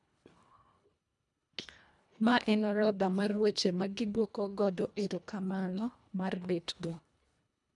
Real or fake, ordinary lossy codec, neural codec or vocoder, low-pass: fake; none; codec, 24 kHz, 1.5 kbps, HILCodec; 10.8 kHz